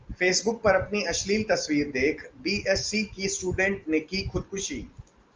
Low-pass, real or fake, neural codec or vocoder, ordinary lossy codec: 7.2 kHz; real; none; Opus, 24 kbps